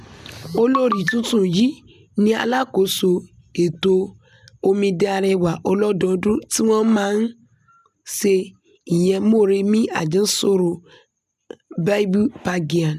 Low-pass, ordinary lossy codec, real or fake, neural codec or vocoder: 14.4 kHz; none; real; none